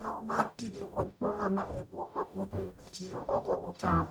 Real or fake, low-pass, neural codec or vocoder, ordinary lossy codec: fake; none; codec, 44.1 kHz, 0.9 kbps, DAC; none